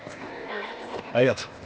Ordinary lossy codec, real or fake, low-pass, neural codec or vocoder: none; fake; none; codec, 16 kHz, 0.8 kbps, ZipCodec